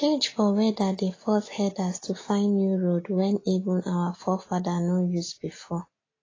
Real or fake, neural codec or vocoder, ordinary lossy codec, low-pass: real; none; AAC, 32 kbps; 7.2 kHz